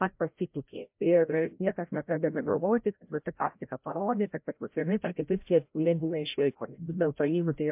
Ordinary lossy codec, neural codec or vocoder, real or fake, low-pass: MP3, 32 kbps; codec, 16 kHz, 0.5 kbps, FreqCodec, larger model; fake; 3.6 kHz